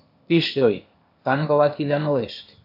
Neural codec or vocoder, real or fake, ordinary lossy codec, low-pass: codec, 16 kHz, 0.8 kbps, ZipCodec; fake; AAC, 48 kbps; 5.4 kHz